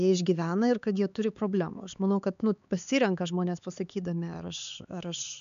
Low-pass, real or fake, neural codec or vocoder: 7.2 kHz; fake; codec, 16 kHz, 4 kbps, X-Codec, HuBERT features, trained on LibriSpeech